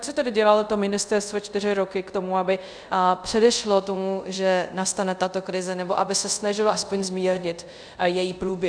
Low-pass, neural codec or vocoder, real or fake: 9.9 kHz; codec, 24 kHz, 0.5 kbps, DualCodec; fake